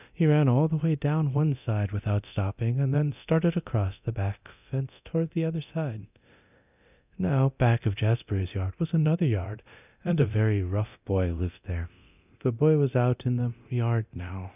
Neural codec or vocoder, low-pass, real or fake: codec, 24 kHz, 0.9 kbps, DualCodec; 3.6 kHz; fake